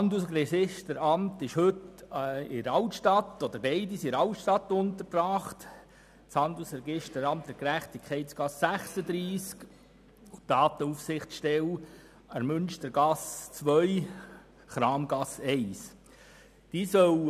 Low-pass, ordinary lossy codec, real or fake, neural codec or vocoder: 14.4 kHz; none; real; none